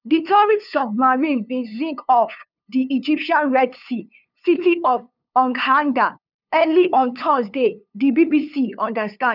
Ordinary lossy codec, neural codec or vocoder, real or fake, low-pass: none; codec, 16 kHz, 2 kbps, FunCodec, trained on LibriTTS, 25 frames a second; fake; 5.4 kHz